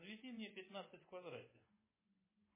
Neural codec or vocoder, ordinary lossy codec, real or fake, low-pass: vocoder, 24 kHz, 100 mel bands, Vocos; MP3, 16 kbps; fake; 3.6 kHz